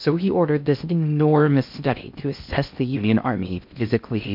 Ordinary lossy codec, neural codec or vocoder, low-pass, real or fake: MP3, 48 kbps; codec, 16 kHz in and 24 kHz out, 0.6 kbps, FocalCodec, streaming, 2048 codes; 5.4 kHz; fake